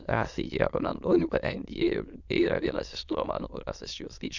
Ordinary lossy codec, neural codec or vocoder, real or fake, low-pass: Opus, 64 kbps; autoencoder, 22.05 kHz, a latent of 192 numbers a frame, VITS, trained on many speakers; fake; 7.2 kHz